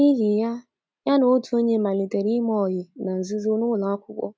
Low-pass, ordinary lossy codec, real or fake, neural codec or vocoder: none; none; real; none